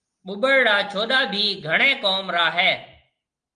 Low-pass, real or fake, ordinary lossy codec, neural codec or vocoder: 9.9 kHz; real; Opus, 32 kbps; none